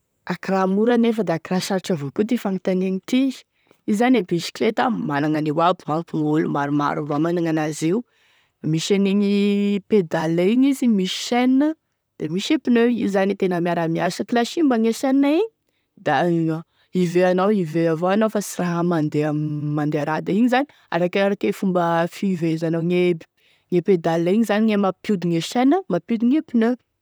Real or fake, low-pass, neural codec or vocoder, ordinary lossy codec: fake; none; vocoder, 44.1 kHz, 128 mel bands, Pupu-Vocoder; none